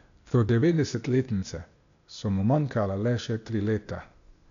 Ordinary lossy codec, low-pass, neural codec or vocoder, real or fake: MP3, 96 kbps; 7.2 kHz; codec, 16 kHz, 0.8 kbps, ZipCodec; fake